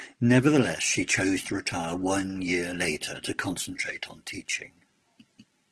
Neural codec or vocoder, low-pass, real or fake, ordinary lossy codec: none; 10.8 kHz; real; Opus, 16 kbps